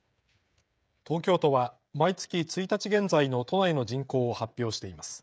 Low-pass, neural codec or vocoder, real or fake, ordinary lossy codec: none; codec, 16 kHz, 16 kbps, FreqCodec, smaller model; fake; none